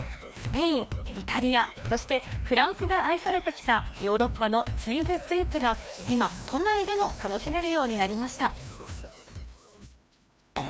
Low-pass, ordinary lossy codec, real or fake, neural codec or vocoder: none; none; fake; codec, 16 kHz, 1 kbps, FreqCodec, larger model